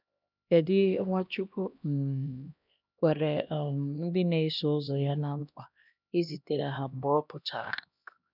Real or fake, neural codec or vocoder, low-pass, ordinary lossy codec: fake; codec, 16 kHz, 1 kbps, X-Codec, HuBERT features, trained on LibriSpeech; 5.4 kHz; none